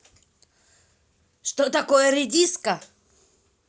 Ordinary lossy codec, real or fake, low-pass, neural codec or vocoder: none; real; none; none